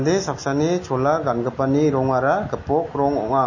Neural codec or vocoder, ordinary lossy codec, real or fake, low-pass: none; MP3, 32 kbps; real; 7.2 kHz